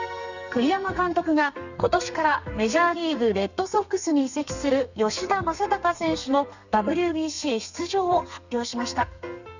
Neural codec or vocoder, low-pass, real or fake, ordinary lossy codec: codec, 32 kHz, 1.9 kbps, SNAC; 7.2 kHz; fake; none